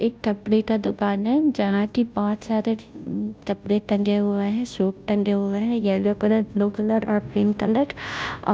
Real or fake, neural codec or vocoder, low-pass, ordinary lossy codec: fake; codec, 16 kHz, 0.5 kbps, FunCodec, trained on Chinese and English, 25 frames a second; none; none